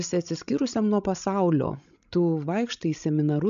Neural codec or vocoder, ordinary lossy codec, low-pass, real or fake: codec, 16 kHz, 16 kbps, FreqCodec, larger model; MP3, 96 kbps; 7.2 kHz; fake